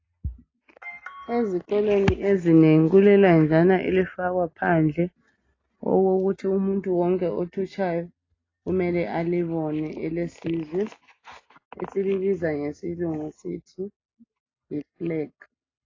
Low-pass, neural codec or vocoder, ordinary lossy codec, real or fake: 7.2 kHz; none; AAC, 32 kbps; real